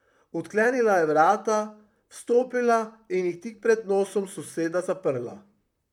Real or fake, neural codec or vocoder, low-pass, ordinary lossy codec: fake; vocoder, 44.1 kHz, 128 mel bands, Pupu-Vocoder; 19.8 kHz; none